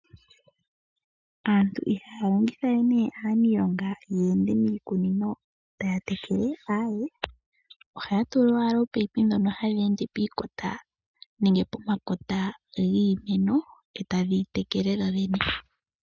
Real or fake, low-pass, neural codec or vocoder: real; 7.2 kHz; none